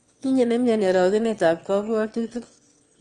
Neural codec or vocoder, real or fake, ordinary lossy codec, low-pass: autoencoder, 22.05 kHz, a latent of 192 numbers a frame, VITS, trained on one speaker; fake; Opus, 24 kbps; 9.9 kHz